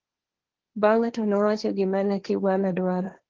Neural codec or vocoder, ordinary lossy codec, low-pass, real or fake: codec, 16 kHz, 1.1 kbps, Voila-Tokenizer; Opus, 16 kbps; 7.2 kHz; fake